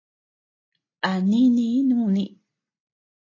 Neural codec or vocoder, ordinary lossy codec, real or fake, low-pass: none; AAC, 32 kbps; real; 7.2 kHz